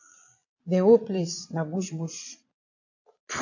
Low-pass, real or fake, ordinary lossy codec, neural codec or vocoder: 7.2 kHz; fake; AAC, 48 kbps; vocoder, 22.05 kHz, 80 mel bands, Vocos